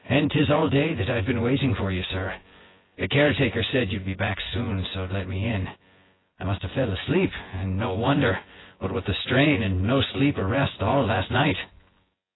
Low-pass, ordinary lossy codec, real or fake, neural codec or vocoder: 7.2 kHz; AAC, 16 kbps; fake; vocoder, 24 kHz, 100 mel bands, Vocos